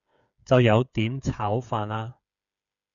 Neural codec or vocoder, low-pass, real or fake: codec, 16 kHz, 8 kbps, FreqCodec, smaller model; 7.2 kHz; fake